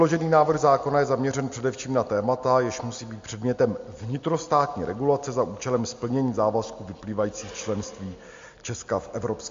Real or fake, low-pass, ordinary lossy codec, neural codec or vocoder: real; 7.2 kHz; MP3, 48 kbps; none